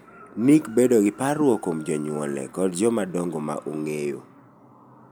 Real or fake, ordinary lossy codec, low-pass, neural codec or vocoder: real; none; none; none